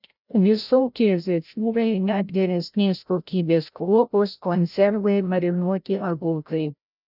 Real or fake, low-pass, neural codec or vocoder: fake; 5.4 kHz; codec, 16 kHz, 0.5 kbps, FreqCodec, larger model